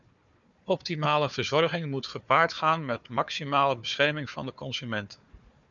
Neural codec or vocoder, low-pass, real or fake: codec, 16 kHz, 4 kbps, FunCodec, trained on Chinese and English, 50 frames a second; 7.2 kHz; fake